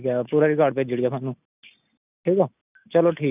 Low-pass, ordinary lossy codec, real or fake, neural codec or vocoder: 3.6 kHz; none; real; none